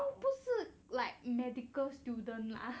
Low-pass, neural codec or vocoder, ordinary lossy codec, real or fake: none; none; none; real